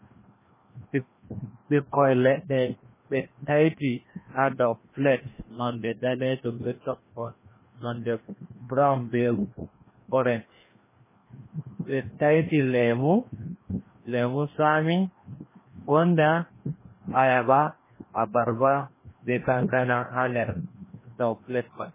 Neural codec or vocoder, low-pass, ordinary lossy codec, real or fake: codec, 16 kHz, 1 kbps, FreqCodec, larger model; 3.6 kHz; MP3, 16 kbps; fake